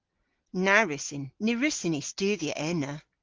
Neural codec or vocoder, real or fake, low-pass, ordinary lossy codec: none; real; 7.2 kHz; Opus, 16 kbps